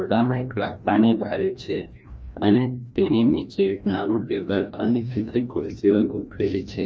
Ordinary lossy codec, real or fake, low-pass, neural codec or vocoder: none; fake; none; codec, 16 kHz, 1 kbps, FreqCodec, larger model